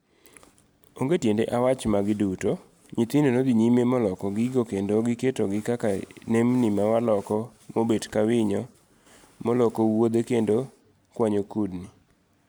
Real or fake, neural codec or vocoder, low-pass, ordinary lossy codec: real; none; none; none